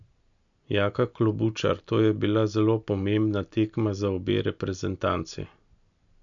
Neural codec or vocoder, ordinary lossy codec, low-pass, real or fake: none; none; 7.2 kHz; real